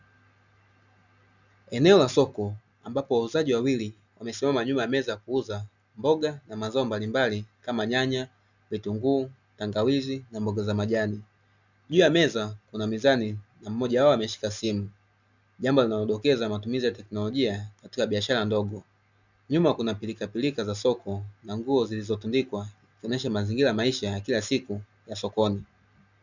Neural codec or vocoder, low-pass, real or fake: none; 7.2 kHz; real